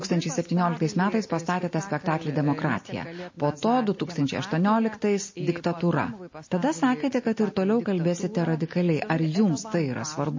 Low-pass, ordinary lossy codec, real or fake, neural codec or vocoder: 7.2 kHz; MP3, 32 kbps; real; none